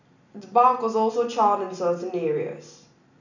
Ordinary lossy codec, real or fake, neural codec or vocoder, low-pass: none; real; none; 7.2 kHz